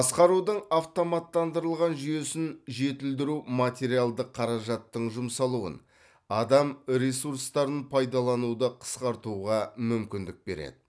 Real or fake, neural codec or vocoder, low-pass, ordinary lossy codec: real; none; none; none